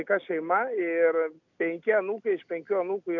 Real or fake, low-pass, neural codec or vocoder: real; 7.2 kHz; none